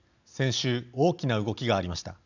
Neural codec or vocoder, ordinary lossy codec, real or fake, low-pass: none; none; real; 7.2 kHz